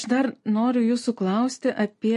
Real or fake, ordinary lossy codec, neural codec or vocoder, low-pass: real; MP3, 48 kbps; none; 14.4 kHz